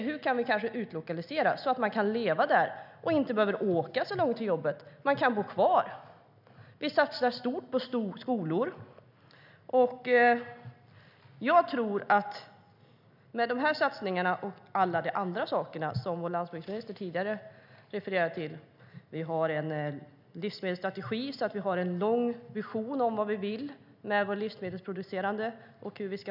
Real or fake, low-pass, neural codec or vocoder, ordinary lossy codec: real; 5.4 kHz; none; none